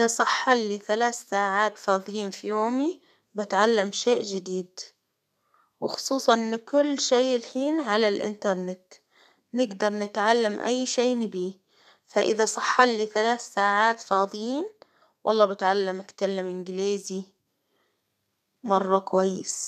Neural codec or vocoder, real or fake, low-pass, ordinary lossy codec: codec, 32 kHz, 1.9 kbps, SNAC; fake; 14.4 kHz; none